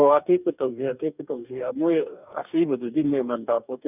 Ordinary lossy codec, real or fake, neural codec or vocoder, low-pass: none; fake; codec, 44.1 kHz, 2.6 kbps, DAC; 3.6 kHz